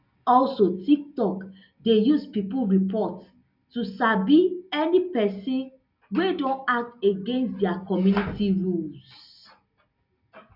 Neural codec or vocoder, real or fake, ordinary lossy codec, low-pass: none; real; none; 5.4 kHz